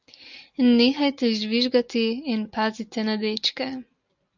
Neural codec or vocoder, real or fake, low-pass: none; real; 7.2 kHz